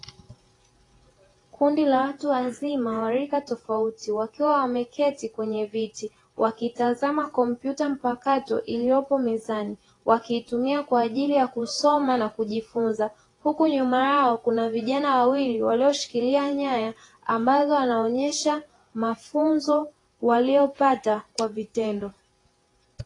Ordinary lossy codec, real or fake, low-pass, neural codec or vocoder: AAC, 32 kbps; fake; 10.8 kHz; vocoder, 48 kHz, 128 mel bands, Vocos